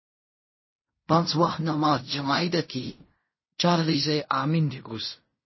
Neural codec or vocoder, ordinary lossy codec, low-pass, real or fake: codec, 16 kHz in and 24 kHz out, 0.4 kbps, LongCat-Audio-Codec, fine tuned four codebook decoder; MP3, 24 kbps; 7.2 kHz; fake